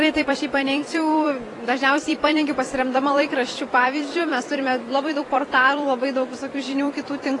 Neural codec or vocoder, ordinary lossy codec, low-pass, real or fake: vocoder, 44.1 kHz, 128 mel bands every 256 samples, BigVGAN v2; AAC, 32 kbps; 10.8 kHz; fake